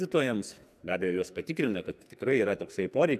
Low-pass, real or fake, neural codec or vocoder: 14.4 kHz; fake; codec, 44.1 kHz, 2.6 kbps, SNAC